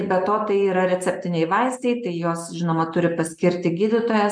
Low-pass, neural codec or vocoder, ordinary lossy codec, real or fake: 9.9 kHz; none; MP3, 96 kbps; real